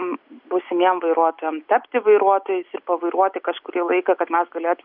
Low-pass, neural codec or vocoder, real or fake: 5.4 kHz; none; real